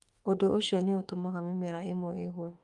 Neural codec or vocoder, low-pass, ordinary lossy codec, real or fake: autoencoder, 48 kHz, 32 numbers a frame, DAC-VAE, trained on Japanese speech; 10.8 kHz; Opus, 32 kbps; fake